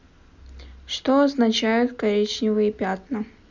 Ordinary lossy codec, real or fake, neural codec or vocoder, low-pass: none; real; none; 7.2 kHz